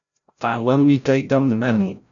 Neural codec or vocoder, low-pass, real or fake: codec, 16 kHz, 0.5 kbps, FreqCodec, larger model; 7.2 kHz; fake